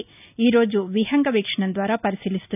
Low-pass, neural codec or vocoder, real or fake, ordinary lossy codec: 3.6 kHz; none; real; none